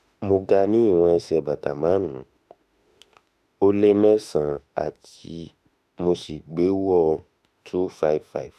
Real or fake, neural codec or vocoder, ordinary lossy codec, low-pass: fake; autoencoder, 48 kHz, 32 numbers a frame, DAC-VAE, trained on Japanese speech; none; 14.4 kHz